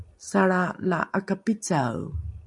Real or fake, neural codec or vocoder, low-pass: real; none; 10.8 kHz